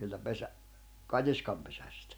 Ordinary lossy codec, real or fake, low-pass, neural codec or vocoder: none; real; none; none